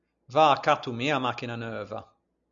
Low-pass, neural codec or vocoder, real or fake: 7.2 kHz; none; real